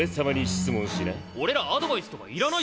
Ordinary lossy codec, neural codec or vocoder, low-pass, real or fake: none; none; none; real